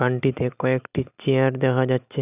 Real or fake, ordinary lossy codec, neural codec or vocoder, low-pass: real; none; none; 3.6 kHz